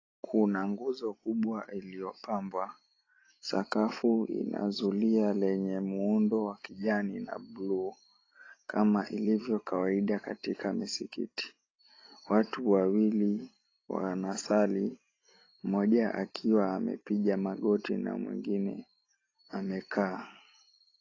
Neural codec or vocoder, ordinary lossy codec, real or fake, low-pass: none; AAC, 32 kbps; real; 7.2 kHz